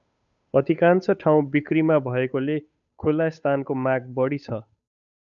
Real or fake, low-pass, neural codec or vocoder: fake; 7.2 kHz; codec, 16 kHz, 8 kbps, FunCodec, trained on Chinese and English, 25 frames a second